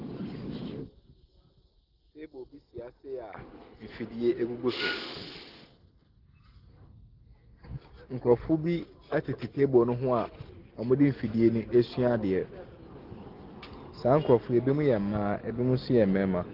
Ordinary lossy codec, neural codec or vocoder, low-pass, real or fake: Opus, 16 kbps; none; 5.4 kHz; real